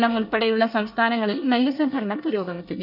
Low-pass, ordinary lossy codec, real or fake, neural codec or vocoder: 5.4 kHz; none; fake; codec, 24 kHz, 1 kbps, SNAC